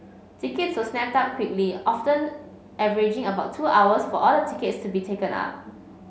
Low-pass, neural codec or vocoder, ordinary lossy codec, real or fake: none; none; none; real